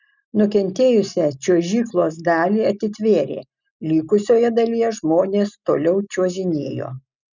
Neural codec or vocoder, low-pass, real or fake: none; 7.2 kHz; real